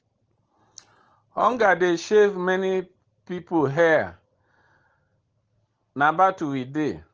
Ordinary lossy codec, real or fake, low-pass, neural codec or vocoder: Opus, 16 kbps; real; 7.2 kHz; none